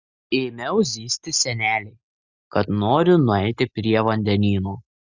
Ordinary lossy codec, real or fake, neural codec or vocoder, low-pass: Opus, 64 kbps; real; none; 7.2 kHz